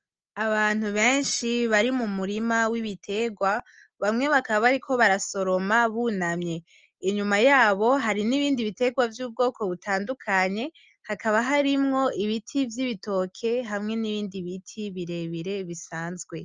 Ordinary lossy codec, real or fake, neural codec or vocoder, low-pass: Opus, 24 kbps; real; none; 7.2 kHz